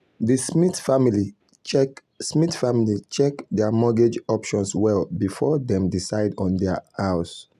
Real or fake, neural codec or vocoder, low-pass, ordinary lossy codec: real; none; 14.4 kHz; none